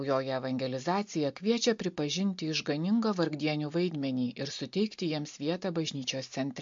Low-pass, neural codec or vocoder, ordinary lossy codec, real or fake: 7.2 kHz; none; MP3, 48 kbps; real